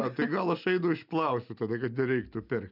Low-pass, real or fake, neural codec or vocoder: 5.4 kHz; real; none